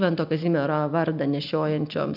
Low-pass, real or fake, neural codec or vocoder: 5.4 kHz; real; none